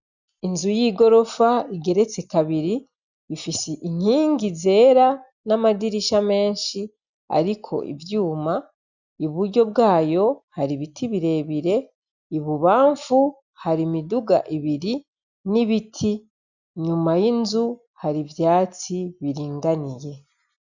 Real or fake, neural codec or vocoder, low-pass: real; none; 7.2 kHz